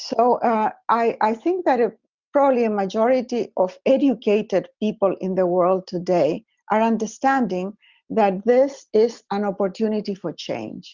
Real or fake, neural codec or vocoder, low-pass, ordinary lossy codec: real; none; 7.2 kHz; Opus, 64 kbps